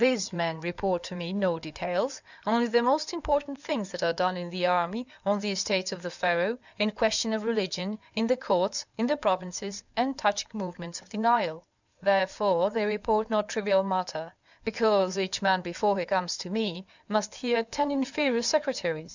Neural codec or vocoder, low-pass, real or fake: codec, 16 kHz in and 24 kHz out, 2.2 kbps, FireRedTTS-2 codec; 7.2 kHz; fake